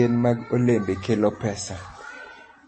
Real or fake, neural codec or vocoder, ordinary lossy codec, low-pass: real; none; MP3, 32 kbps; 9.9 kHz